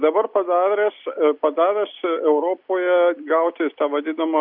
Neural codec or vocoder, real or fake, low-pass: none; real; 5.4 kHz